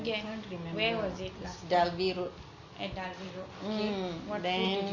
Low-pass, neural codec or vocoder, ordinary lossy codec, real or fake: 7.2 kHz; none; none; real